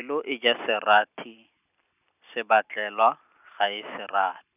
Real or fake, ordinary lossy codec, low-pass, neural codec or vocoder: real; none; 3.6 kHz; none